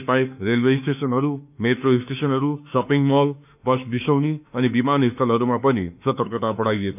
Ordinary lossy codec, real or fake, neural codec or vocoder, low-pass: none; fake; autoencoder, 48 kHz, 32 numbers a frame, DAC-VAE, trained on Japanese speech; 3.6 kHz